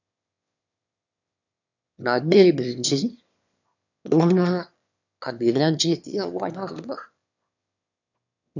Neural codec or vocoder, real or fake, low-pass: autoencoder, 22.05 kHz, a latent of 192 numbers a frame, VITS, trained on one speaker; fake; 7.2 kHz